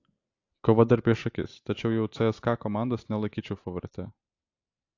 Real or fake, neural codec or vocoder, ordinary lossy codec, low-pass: real; none; AAC, 48 kbps; 7.2 kHz